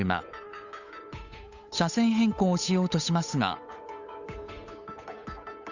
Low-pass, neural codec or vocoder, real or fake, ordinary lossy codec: 7.2 kHz; codec, 16 kHz, 8 kbps, FunCodec, trained on Chinese and English, 25 frames a second; fake; none